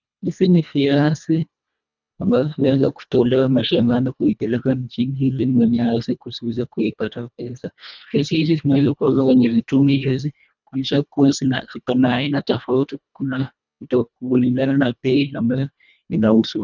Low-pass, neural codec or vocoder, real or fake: 7.2 kHz; codec, 24 kHz, 1.5 kbps, HILCodec; fake